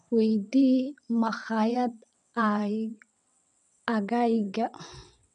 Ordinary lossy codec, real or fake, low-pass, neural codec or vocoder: none; fake; 9.9 kHz; vocoder, 22.05 kHz, 80 mel bands, WaveNeXt